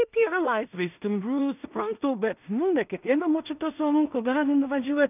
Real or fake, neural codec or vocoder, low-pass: fake; codec, 16 kHz in and 24 kHz out, 0.4 kbps, LongCat-Audio-Codec, two codebook decoder; 3.6 kHz